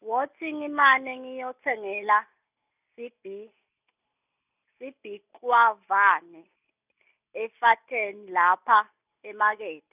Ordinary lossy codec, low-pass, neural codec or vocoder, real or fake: none; 3.6 kHz; none; real